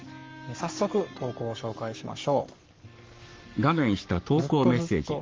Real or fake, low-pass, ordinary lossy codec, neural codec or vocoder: fake; 7.2 kHz; Opus, 32 kbps; codec, 44.1 kHz, 7.8 kbps, Pupu-Codec